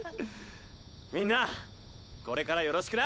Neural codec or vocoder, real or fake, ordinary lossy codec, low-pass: codec, 16 kHz, 8 kbps, FunCodec, trained on Chinese and English, 25 frames a second; fake; none; none